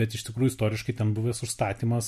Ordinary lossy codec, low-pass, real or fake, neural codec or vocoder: MP3, 64 kbps; 14.4 kHz; real; none